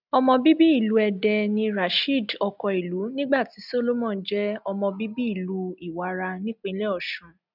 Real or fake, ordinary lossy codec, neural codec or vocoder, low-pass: real; none; none; 5.4 kHz